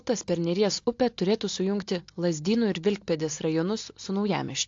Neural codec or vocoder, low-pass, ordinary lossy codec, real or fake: none; 7.2 kHz; AAC, 48 kbps; real